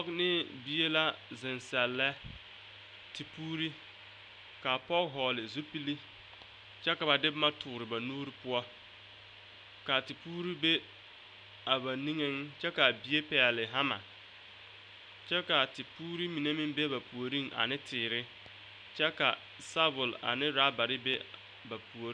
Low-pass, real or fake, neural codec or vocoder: 9.9 kHz; real; none